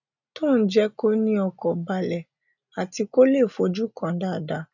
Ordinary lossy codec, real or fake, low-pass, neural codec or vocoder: none; real; 7.2 kHz; none